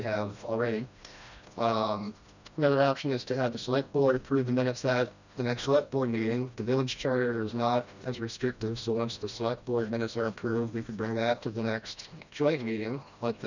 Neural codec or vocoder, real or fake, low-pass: codec, 16 kHz, 1 kbps, FreqCodec, smaller model; fake; 7.2 kHz